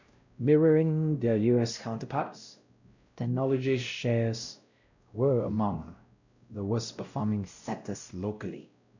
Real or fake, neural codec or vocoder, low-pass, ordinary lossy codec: fake; codec, 16 kHz, 0.5 kbps, X-Codec, WavLM features, trained on Multilingual LibriSpeech; 7.2 kHz; none